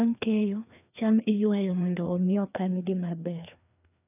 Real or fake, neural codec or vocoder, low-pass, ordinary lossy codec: fake; codec, 16 kHz, 2 kbps, FreqCodec, larger model; 3.6 kHz; none